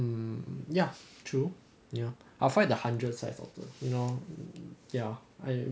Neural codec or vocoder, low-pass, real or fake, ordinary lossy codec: none; none; real; none